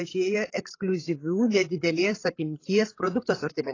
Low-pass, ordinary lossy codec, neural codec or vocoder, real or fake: 7.2 kHz; AAC, 32 kbps; codec, 16 kHz, 8 kbps, FreqCodec, larger model; fake